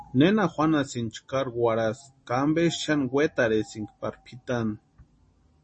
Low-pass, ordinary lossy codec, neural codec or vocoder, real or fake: 10.8 kHz; MP3, 32 kbps; none; real